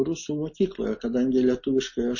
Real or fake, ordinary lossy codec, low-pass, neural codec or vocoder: real; MP3, 32 kbps; 7.2 kHz; none